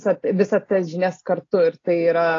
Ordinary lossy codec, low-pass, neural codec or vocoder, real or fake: AAC, 32 kbps; 7.2 kHz; none; real